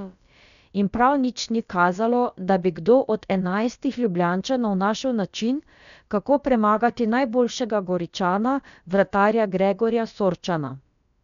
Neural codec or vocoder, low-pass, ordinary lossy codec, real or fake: codec, 16 kHz, about 1 kbps, DyCAST, with the encoder's durations; 7.2 kHz; none; fake